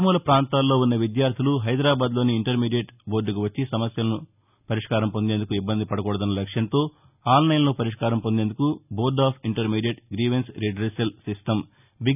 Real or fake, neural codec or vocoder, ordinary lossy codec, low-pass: real; none; none; 3.6 kHz